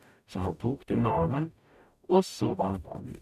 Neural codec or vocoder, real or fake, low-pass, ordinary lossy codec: codec, 44.1 kHz, 0.9 kbps, DAC; fake; 14.4 kHz; none